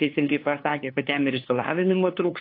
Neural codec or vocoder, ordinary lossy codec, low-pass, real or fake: codec, 16 kHz, 2 kbps, FunCodec, trained on LibriTTS, 25 frames a second; AAC, 32 kbps; 5.4 kHz; fake